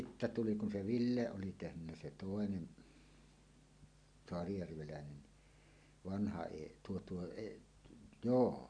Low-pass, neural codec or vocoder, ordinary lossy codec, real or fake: 9.9 kHz; none; none; real